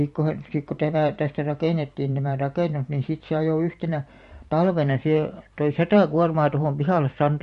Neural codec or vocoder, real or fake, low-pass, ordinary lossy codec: vocoder, 44.1 kHz, 128 mel bands every 512 samples, BigVGAN v2; fake; 14.4 kHz; MP3, 48 kbps